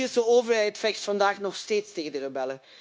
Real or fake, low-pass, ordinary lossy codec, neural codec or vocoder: fake; none; none; codec, 16 kHz, 0.9 kbps, LongCat-Audio-Codec